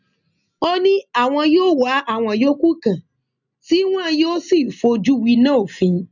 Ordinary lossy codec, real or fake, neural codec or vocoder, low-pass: none; real; none; 7.2 kHz